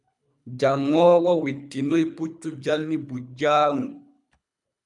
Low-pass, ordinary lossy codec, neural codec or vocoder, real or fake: 10.8 kHz; MP3, 96 kbps; codec, 24 kHz, 3 kbps, HILCodec; fake